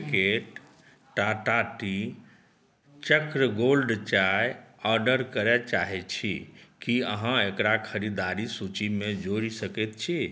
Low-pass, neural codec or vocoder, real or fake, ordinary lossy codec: none; none; real; none